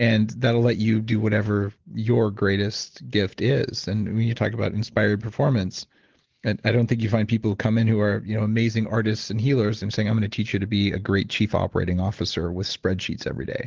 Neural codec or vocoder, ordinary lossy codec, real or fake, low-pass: none; Opus, 16 kbps; real; 7.2 kHz